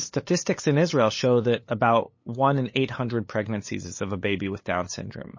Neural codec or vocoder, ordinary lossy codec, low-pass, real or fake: codec, 16 kHz, 8 kbps, FunCodec, trained on LibriTTS, 25 frames a second; MP3, 32 kbps; 7.2 kHz; fake